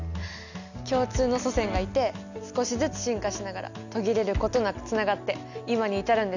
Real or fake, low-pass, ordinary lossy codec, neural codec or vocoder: real; 7.2 kHz; none; none